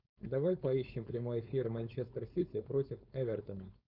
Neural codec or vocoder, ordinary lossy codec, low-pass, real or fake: codec, 16 kHz, 4.8 kbps, FACodec; Opus, 64 kbps; 5.4 kHz; fake